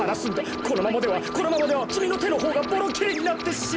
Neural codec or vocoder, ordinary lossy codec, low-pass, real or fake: none; none; none; real